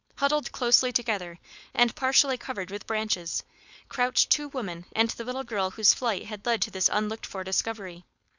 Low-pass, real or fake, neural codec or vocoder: 7.2 kHz; fake; codec, 16 kHz, 4.8 kbps, FACodec